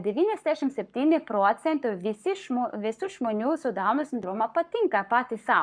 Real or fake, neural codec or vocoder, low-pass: fake; codec, 44.1 kHz, 7.8 kbps, Pupu-Codec; 9.9 kHz